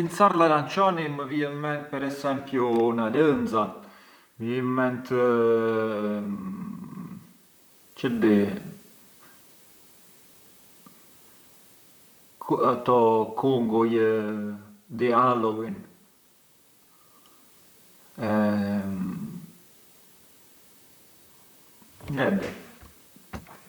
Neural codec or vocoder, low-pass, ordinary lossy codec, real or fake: vocoder, 44.1 kHz, 128 mel bands, Pupu-Vocoder; none; none; fake